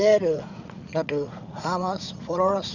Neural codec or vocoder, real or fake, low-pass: vocoder, 44.1 kHz, 80 mel bands, Vocos; fake; 7.2 kHz